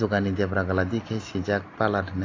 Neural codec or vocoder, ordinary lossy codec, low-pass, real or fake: none; AAC, 32 kbps; 7.2 kHz; real